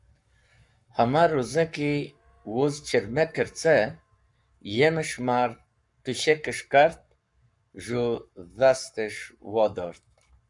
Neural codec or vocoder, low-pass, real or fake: codec, 44.1 kHz, 7.8 kbps, Pupu-Codec; 10.8 kHz; fake